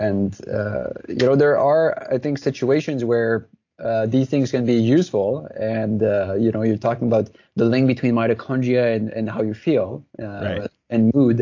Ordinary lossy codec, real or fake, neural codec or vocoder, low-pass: AAC, 48 kbps; real; none; 7.2 kHz